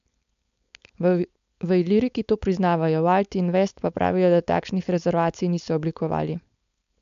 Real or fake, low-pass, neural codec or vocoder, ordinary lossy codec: fake; 7.2 kHz; codec, 16 kHz, 4.8 kbps, FACodec; MP3, 64 kbps